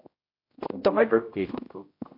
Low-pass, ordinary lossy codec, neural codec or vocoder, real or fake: 5.4 kHz; MP3, 32 kbps; codec, 16 kHz, 0.5 kbps, X-Codec, HuBERT features, trained on general audio; fake